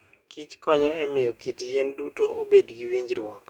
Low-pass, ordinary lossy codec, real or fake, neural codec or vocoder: 19.8 kHz; none; fake; codec, 44.1 kHz, 2.6 kbps, DAC